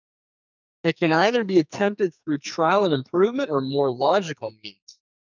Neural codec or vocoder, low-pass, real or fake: codec, 44.1 kHz, 2.6 kbps, SNAC; 7.2 kHz; fake